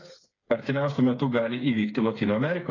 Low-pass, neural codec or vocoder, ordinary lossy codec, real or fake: 7.2 kHz; codec, 16 kHz, 4 kbps, FreqCodec, smaller model; AAC, 32 kbps; fake